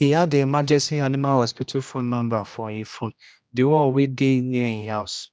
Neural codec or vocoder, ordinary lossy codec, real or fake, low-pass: codec, 16 kHz, 1 kbps, X-Codec, HuBERT features, trained on general audio; none; fake; none